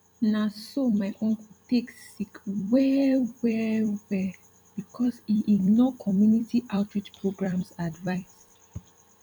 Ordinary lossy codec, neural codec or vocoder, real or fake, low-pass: none; vocoder, 44.1 kHz, 128 mel bands every 256 samples, BigVGAN v2; fake; 19.8 kHz